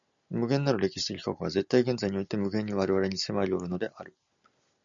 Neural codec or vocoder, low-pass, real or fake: none; 7.2 kHz; real